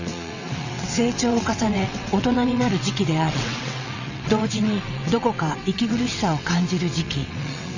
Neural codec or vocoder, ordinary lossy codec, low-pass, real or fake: vocoder, 22.05 kHz, 80 mel bands, WaveNeXt; none; 7.2 kHz; fake